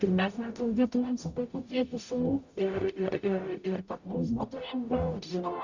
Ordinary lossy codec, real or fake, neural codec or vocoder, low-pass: Opus, 64 kbps; fake; codec, 44.1 kHz, 0.9 kbps, DAC; 7.2 kHz